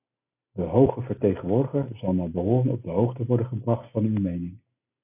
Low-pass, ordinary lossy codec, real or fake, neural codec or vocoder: 3.6 kHz; AAC, 24 kbps; real; none